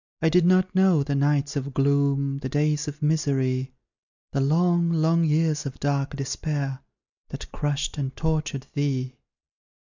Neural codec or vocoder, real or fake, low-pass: none; real; 7.2 kHz